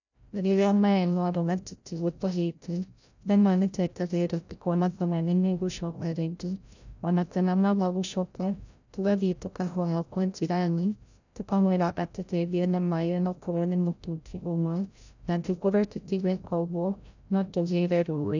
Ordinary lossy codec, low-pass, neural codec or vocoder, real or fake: none; 7.2 kHz; codec, 16 kHz, 0.5 kbps, FreqCodec, larger model; fake